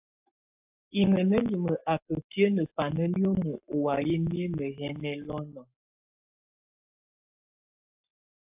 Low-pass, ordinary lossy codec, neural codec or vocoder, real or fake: 3.6 kHz; AAC, 32 kbps; codec, 44.1 kHz, 7.8 kbps, Pupu-Codec; fake